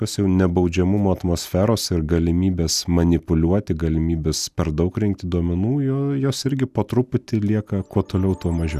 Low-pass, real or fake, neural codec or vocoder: 14.4 kHz; real; none